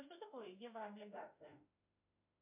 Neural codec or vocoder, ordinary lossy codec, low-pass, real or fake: autoencoder, 48 kHz, 32 numbers a frame, DAC-VAE, trained on Japanese speech; MP3, 32 kbps; 3.6 kHz; fake